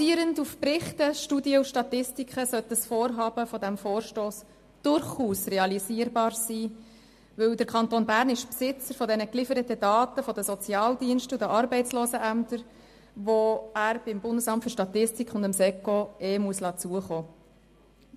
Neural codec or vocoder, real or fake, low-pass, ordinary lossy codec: none; real; 14.4 kHz; MP3, 64 kbps